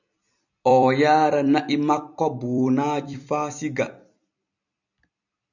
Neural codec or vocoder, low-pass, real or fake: vocoder, 44.1 kHz, 128 mel bands every 256 samples, BigVGAN v2; 7.2 kHz; fake